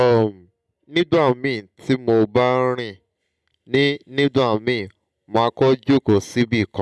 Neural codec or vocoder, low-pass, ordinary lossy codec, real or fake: none; none; none; real